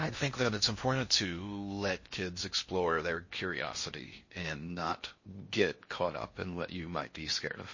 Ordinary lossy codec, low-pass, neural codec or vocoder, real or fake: MP3, 32 kbps; 7.2 kHz; codec, 16 kHz in and 24 kHz out, 0.6 kbps, FocalCodec, streaming, 4096 codes; fake